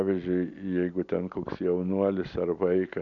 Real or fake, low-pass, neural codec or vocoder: real; 7.2 kHz; none